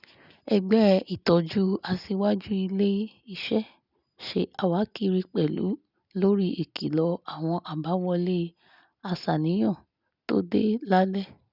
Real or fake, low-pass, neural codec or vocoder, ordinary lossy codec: real; 5.4 kHz; none; none